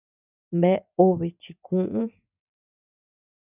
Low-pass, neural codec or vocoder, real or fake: 3.6 kHz; vocoder, 24 kHz, 100 mel bands, Vocos; fake